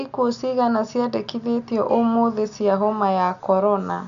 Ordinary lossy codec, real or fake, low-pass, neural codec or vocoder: none; real; 7.2 kHz; none